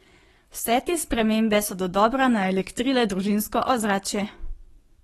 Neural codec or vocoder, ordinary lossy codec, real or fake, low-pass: codec, 44.1 kHz, 7.8 kbps, DAC; AAC, 32 kbps; fake; 19.8 kHz